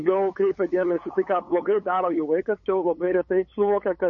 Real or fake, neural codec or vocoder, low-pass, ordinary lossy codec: fake; codec, 16 kHz, 8 kbps, FunCodec, trained on LibriTTS, 25 frames a second; 7.2 kHz; MP3, 32 kbps